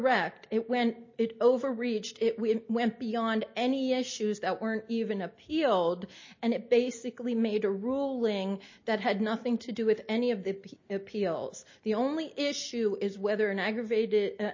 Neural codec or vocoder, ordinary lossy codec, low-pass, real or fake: none; MP3, 32 kbps; 7.2 kHz; real